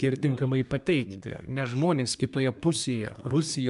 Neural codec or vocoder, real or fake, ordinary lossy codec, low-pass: codec, 24 kHz, 1 kbps, SNAC; fake; MP3, 96 kbps; 10.8 kHz